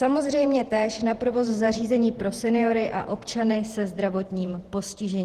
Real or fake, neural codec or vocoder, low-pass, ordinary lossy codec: fake; vocoder, 48 kHz, 128 mel bands, Vocos; 14.4 kHz; Opus, 16 kbps